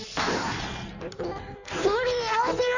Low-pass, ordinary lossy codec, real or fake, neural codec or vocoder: 7.2 kHz; none; fake; codec, 16 kHz in and 24 kHz out, 1.1 kbps, FireRedTTS-2 codec